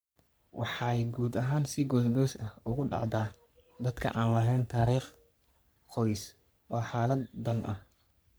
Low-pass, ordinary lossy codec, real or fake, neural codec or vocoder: none; none; fake; codec, 44.1 kHz, 3.4 kbps, Pupu-Codec